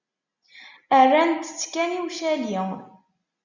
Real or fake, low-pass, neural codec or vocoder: real; 7.2 kHz; none